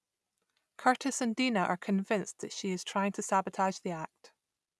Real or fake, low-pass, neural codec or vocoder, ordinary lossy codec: real; none; none; none